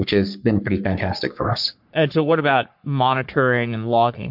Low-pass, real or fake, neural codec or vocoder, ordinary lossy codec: 5.4 kHz; fake; codec, 44.1 kHz, 3.4 kbps, Pupu-Codec; MP3, 48 kbps